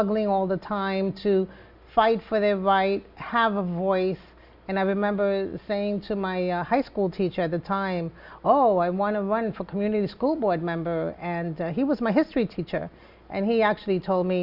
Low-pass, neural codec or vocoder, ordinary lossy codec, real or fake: 5.4 kHz; none; Opus, 64 kbps; real